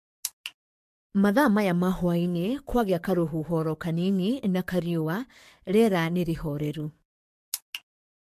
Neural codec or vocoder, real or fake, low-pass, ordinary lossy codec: codec, 44.1 kHz, 7.8 kbps, DAC; fake; 14.4 kHz; MP3, 64 kbps